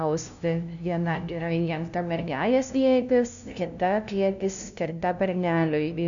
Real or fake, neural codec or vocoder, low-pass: fake; codec, 16 kHz, 0.5 kbps, FunCodec, trained on LibriTTS, 25 frames a second; 7.2 kHz